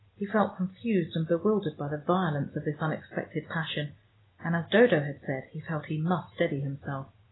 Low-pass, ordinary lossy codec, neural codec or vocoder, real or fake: 7.2 kHz; AAC, 16 kbps; none; real